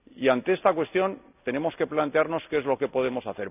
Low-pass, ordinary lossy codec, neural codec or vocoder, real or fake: 3.6 kHz; none; none; real